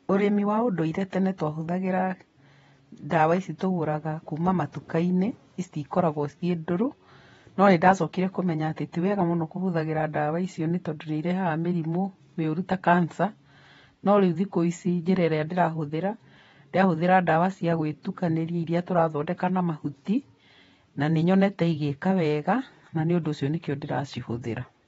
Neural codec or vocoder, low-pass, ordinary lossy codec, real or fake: vocoder, 48 kHz, 128 mel bands, Vocos; 19.8 kHz; AAC, 24 kbps; fake